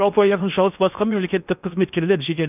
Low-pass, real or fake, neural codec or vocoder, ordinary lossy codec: 3.6 kHz; fake; codec, 16 kHz in and 24 kHz out, 0.8 kbps, FocalCodec, streaming, 65536 codes; none